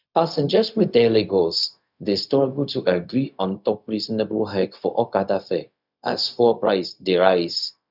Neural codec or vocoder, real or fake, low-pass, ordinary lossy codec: codec, 16 kHz, 0.4 kbps, LongCat-Audio-Codec; fake; 5.4 kHz; none